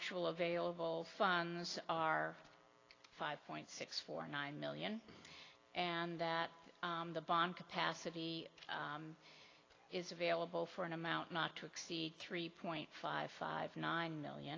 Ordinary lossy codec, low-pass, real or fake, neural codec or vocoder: AAC, 32 kbps; 7.2 kHz; real; none